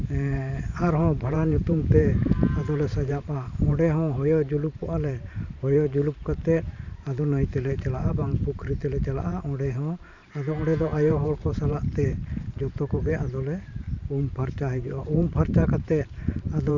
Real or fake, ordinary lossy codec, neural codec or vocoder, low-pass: fake; none; vocoder, 44.1 kHz, 128 mel bands every 512 samples, BigVGAN v2; 7.2 kHz